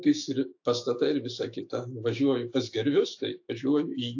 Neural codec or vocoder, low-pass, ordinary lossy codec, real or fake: autoencoder, 48 kHz, 128 numbers a frame, DAC-VAE, trained on Japanese speech; 7.2 kHz; AAC, 48 kbps; fake